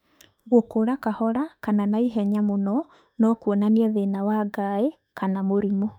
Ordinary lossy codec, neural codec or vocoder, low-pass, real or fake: none; autoencoder, 48 kHz, 32 numbers a frame, DAC-VAE, trained on Japanese speech; 19.8 kHz; fake